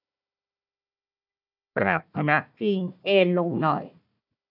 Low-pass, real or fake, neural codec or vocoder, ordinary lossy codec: 5.4 kHz; fake; codec, 16 kHz, 1 kbps, FunCodec, trained on Chinese and English, 50 frames a second; none